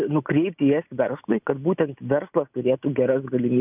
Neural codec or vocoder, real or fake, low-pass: none; real; 3.6 kHz